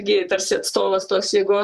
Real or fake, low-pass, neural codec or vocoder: fake; 14.4 kHz; vocoder, 44.1 kHz, 128 mel bands, Pupu-Vocoder